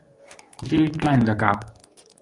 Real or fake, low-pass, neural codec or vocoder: fake; 10.8 kHz; codec, 24 kHz, 0.9 kbps, WavTokenizer, medium speech release version 2